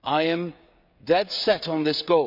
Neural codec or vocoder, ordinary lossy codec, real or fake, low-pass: codec, 16 kHz, 16 kbps, FreqCodec, smaller model; none; fake; 5.4 kHz